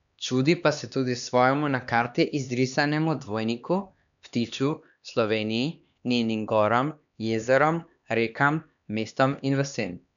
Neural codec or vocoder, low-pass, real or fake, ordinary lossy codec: codec, 16 kHz, 2 kbps, X-Codec, HuBERT features, trained on LibriSpeech; 7.2 kHz; fake; none